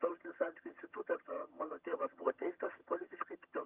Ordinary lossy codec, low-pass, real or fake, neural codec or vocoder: Opus, 32 kbps; 3.6 kHz; fake; vocoder, 22.05 kHz, 80 mel bands, HiFi-GAN